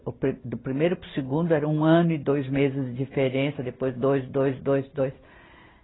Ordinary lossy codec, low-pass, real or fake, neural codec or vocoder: AAC, 16 kbps; 7.2 kHz; real; none